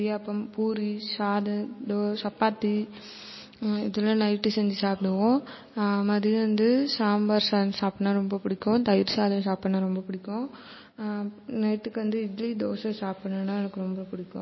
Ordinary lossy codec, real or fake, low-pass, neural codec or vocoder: MP3, 24 kbps; real; 7.2 kHz; none